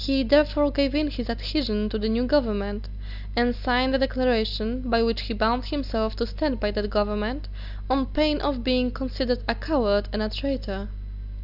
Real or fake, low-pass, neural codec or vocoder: real; 5.4 kHz; none